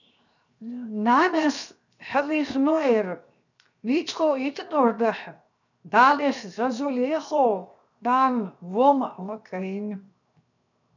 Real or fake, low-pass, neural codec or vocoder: fake; 7.2 kHz; codec, 16 kHz, 0.7 kbps, FocalCodec